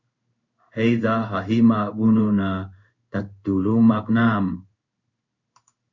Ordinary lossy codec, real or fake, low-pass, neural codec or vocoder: Opus, 64 kbps; fake; 7.2 kHz; codec, 16 kHz in and 24 kHz out, 1 kbps, XY-Tokenizer